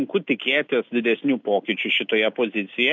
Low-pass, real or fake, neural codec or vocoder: 7.2 kHz; real; none